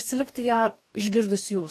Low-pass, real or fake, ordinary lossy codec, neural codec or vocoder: 14.4 kHz; fake; AAC, 64 kbps; codec, 44.1 kHz, 2.6 kbps, DAC